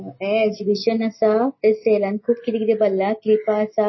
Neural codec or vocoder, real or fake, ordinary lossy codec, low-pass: none; real; MP3, 24 kbps; 7.2 kHz